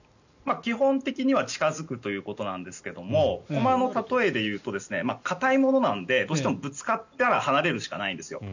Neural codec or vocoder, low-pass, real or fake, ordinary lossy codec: none; 7.2 kHz; real; none